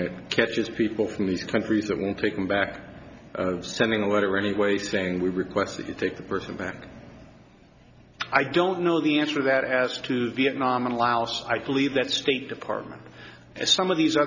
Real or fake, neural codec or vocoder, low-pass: real; none; 7.2 kHz